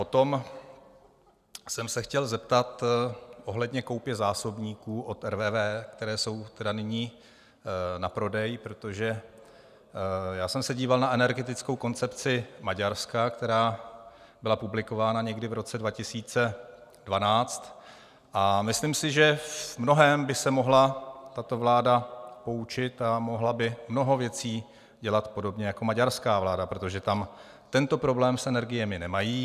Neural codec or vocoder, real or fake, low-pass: none; real; 14.4 kHz